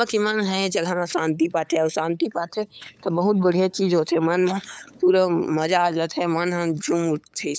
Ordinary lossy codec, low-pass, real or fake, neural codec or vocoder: none; none; fake; codec, 16 kHz, 8 kbps, FunCodec, trained on LibriTTS, 25 frames a second